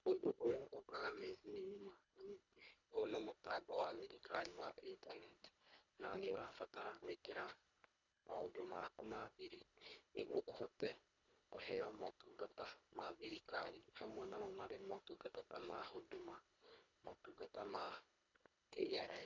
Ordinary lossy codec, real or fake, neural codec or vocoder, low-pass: none; fake; codec, 24 kHz, 1.5 kbps, HILCodec; 7.2 kHz